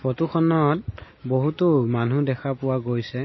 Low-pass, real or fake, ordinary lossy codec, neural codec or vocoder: 7.2 kHz; real; MP3, 24 kbps; none